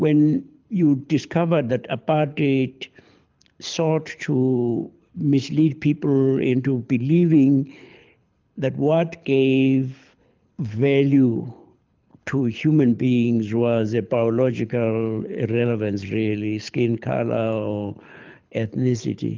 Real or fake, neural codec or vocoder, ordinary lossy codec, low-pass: fake; codec, 24 kHz, 6 kbps, HILCodec; Opus, 24 kbps; 7.2 kHz